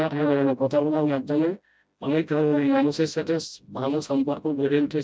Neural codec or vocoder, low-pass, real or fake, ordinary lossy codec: codec, 16 kHz, 0.5 kbps, FreqCodec, smaller model; none; fake; none